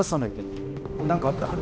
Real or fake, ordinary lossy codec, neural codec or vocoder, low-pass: fake; none; codec, 16 kHz, 1 kbps, X-Codec, HuBERT features, trained on balanced general audio; none